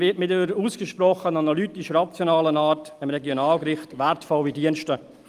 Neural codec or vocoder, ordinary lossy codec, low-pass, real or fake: none; Opus, 32 kbps; 14.4 kHz; real